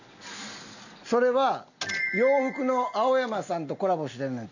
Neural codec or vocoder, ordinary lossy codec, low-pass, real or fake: none; none; 7.2 kHz; real